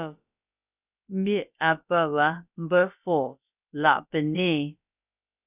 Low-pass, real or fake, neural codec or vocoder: 3.6 kHz; fake; codec, 16 kHz, about 1 kbps, DyCAST, with the encoder's durations